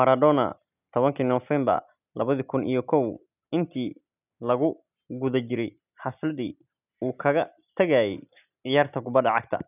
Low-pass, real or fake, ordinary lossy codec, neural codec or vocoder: 3.6 kHz; real; none; none